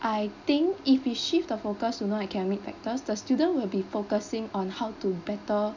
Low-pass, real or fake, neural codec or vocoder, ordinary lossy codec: 7.2 kHz; real; none; none